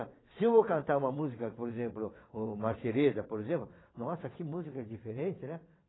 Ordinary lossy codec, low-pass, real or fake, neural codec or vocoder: AAC, 16 kbps; 7.2 kHz; fake; vocoder, 22.05 kHz, 80 mel bands, WaveNeXt